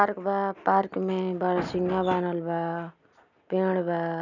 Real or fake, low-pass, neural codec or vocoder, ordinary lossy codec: real; 7.2 kHz; none; none